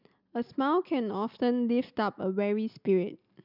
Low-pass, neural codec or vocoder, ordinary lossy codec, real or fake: 5.4 kHz; none; none; real